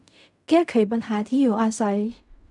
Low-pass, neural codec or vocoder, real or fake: 10.8 kHz; codec, 16 kHz in and 24 kHz out, 0.4 kbps, LongCat-Audio-Codec, fine tuned four codebook decoder; fake